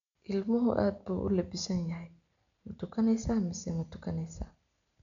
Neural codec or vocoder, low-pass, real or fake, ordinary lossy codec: none; 7.2 kHz; real; none